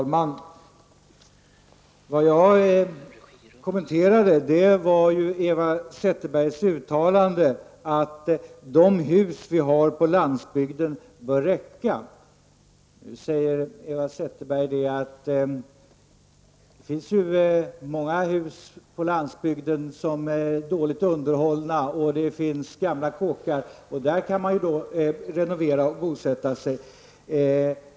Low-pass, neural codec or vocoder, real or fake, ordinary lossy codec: none; none; real; none